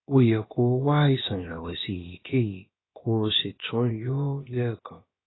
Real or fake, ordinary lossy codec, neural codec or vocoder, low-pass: fake; AAC, 16 kbps; codec, 16 kHz, about 1 kbps, DyCAST, with the encoder's durations; 7.2 kHz